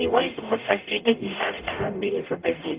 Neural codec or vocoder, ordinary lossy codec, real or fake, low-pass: codec, 44.1 kHz, 0.9 kbps, DAC; Opus, 32 kbps; fake; 3.6 kHz